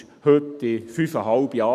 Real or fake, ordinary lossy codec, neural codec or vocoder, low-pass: real; none; none; 14.4 kHz